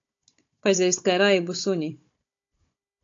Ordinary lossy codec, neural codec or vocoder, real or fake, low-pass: AAC, 48 kbps; codec, 16 kHz, 16 kbps, FunCodec, trained on Chinese and English, 50 frames a second; fake; 7.2 kHz